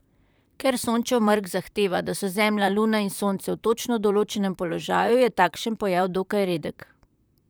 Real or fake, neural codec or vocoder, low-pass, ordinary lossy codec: fake; vocoder, 44.1 kHz, 128 mel bands, Pupu-Vocoder; none; none